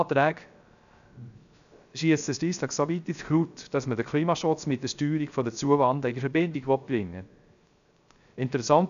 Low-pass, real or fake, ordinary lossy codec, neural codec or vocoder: 7.2 kHz; fake; none; codec, 16 kHz, 0.3 kbps, FocalCodec